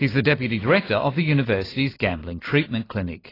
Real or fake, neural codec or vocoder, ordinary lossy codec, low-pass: real; none; AAC, 24 kbps; 5.4 kHz